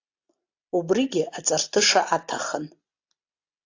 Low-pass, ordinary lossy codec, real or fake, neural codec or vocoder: 7.2 kHz; AAC, 48 kbps; real; none